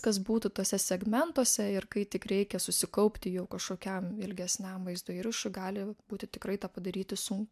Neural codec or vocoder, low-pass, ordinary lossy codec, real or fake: none; 14.4 kHz; MP3, 96 kbps; real